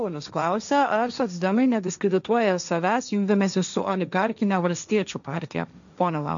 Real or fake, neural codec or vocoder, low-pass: fake; codec, 16 kHz, 1.1 kbps, Voila-Tokenizer; 7.2 kHz